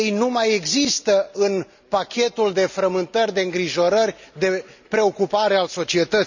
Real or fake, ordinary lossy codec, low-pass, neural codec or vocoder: real; none; 7.2 kHz; none